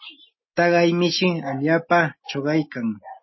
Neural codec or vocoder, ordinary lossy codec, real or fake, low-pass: none; MP3, 24 kbps; real; 7.2 kHz